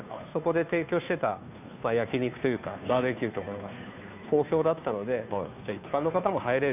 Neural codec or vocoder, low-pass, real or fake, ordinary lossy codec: codec, 16 kHz, 2 kbps, FunCodec, trained on Chinese and English, 25 frames a second; 3.6 kHz; fake; none